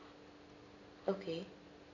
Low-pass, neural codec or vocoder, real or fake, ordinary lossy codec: 7.2 kHz; none; real; none